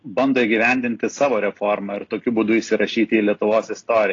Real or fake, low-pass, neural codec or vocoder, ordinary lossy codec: real; 7.2 kHz; none; AAC, 32 kbps